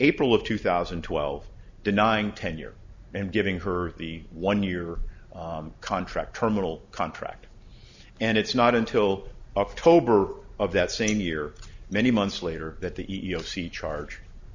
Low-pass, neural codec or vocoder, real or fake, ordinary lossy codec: 7.2 kHz; none; real; Opus, 64 kbps